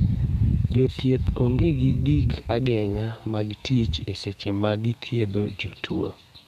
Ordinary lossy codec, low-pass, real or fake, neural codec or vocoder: none; 14.4 kHz; fake; codec, 32 kHz, 1.9 kbps, SNAC